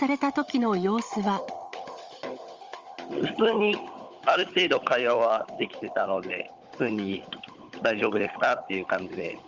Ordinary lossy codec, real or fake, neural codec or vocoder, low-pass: Opus, 32 kbps; fake; codec, 16 kHz, 16 kbps, FunCodec, trained on Chinese and English, 50 frames a second; 7.2 kHz